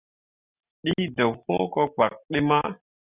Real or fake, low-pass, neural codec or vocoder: real; 3.6 kHz; none